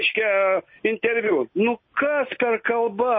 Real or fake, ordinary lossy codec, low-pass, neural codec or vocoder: real; MP3, 24 kbps; 7.2 kHz; none